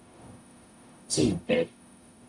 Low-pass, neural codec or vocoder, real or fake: 10.8 kHz; codec, 44.1 kHz, 0.9 kbps, DAC; fake